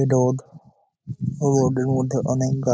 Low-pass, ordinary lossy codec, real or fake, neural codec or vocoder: none; none; real; none